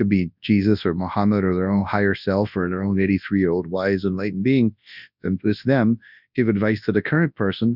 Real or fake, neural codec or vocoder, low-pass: fake; codec, 24 kHz, 0.9 kbps, WavTokenizer, large speech release; 5.4 kHz